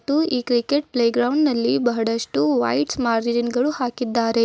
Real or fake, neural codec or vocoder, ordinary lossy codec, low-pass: real; none; none; none